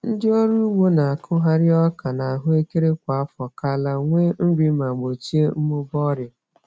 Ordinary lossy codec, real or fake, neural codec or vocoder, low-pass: none; real; none; none